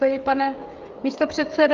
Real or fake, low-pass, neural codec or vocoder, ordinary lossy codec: fake; 7.2 kHz; codec, 16 kHz, 16 kbps, FreqCodec, smaller model; Opus, 32 kbps